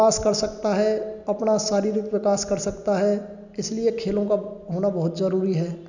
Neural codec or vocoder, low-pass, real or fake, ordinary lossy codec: none; 7.2 kHz; real; none